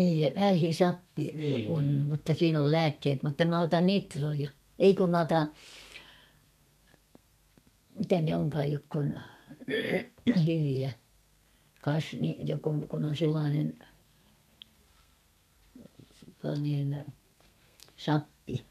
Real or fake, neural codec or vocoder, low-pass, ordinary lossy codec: fake; codec, 32 kHz, 1.9 kbps, SNAC; 14.4 kHz; none